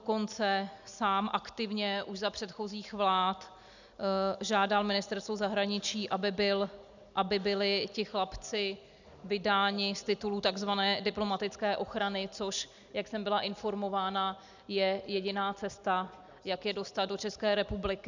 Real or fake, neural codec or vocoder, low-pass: real; none; 7.2 kHz